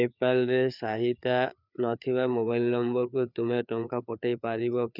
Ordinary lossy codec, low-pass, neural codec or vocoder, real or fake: none; 5.4 kHz; codec, 16 kHz, 4 kbps, FreqCodec, larger model; fake